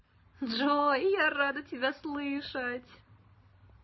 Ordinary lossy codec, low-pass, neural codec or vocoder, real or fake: MP3, 24 kbps; 7.2 kHz; none; real